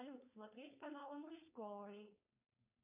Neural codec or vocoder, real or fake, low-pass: codec, 16 kHz, 4.8 kbps, FACodec; fake; 3.6 kHz